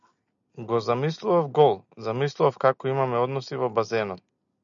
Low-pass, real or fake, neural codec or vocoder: 7.2 kHz; real; none